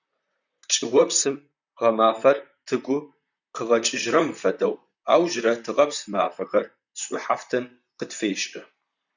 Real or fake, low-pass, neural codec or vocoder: fake; 7.2 kHz; vocoder, 44.1 kHz, 128 mel bands, Pupu-Vocoder